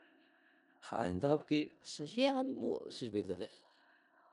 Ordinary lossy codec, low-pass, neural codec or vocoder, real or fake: MP3, 96 kbps; 10.8 kHz; codec, 16 kHz in and 24 kHz out, 0.4 kbps, LongCat-Audio-Codec, four codebook decoder; fake